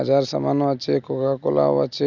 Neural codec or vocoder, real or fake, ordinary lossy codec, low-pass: none; real; none; 7.2 kHz